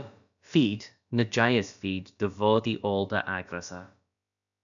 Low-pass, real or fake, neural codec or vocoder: 7.2 kHz; fake; codec, 16 kHz, about 1 kbps, DyCAST, with the encoder's durations